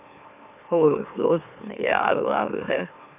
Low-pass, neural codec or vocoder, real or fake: 3.6 kHz; autoencoder, 44.1 kHz, a latent of 192 numbers a frame, MeloTTS; fake